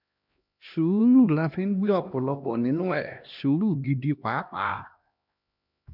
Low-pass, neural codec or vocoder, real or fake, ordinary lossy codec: 5.4 kHz; codec, 16 kHz, 1 kbps, X-Codec, HuBERT features, trained on LibriSpeech; fake; none